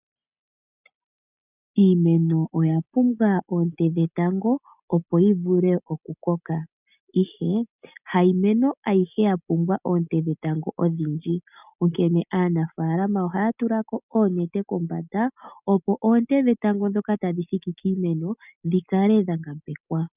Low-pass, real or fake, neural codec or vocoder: 3.6 kHz; real; none